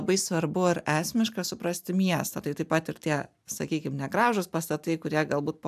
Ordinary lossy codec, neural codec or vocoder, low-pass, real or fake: AAC, 96 kbps; none; 14.4 kHz; real